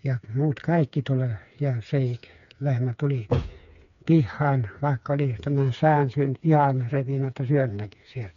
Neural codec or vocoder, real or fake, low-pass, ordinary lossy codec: codec, 16 kHz, 4 kbps, FreqCodec, smaller model; fake; 7.2 kHz; none